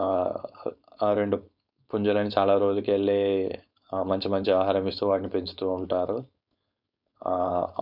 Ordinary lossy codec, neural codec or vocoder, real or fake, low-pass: none; codec, 16 kHz, 4.8 kbps, FACodec; fake; 5.4 kHz